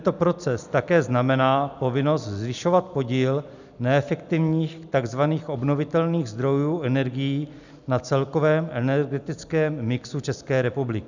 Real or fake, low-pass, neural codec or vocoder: real; 7.2 kHz; none